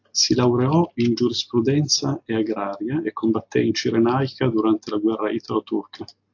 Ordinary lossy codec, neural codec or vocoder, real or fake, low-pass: AAC, 48 kbps; none; real; 7.2 kHz